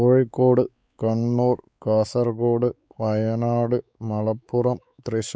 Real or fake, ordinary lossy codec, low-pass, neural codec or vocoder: fake; none; none; codec, 16 kHz, 4 kbps, X-Codec, WavLM features, trained on Multilingual LibriSpeech